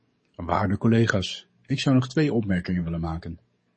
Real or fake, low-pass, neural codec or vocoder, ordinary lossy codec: fake; 10.8 kHz; codec, 44.1 kHz, 7.8 kbps, DAC; MP3, 32 kbps